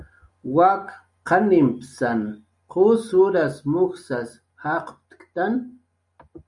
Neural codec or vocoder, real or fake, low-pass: none; real; 10.8 kHz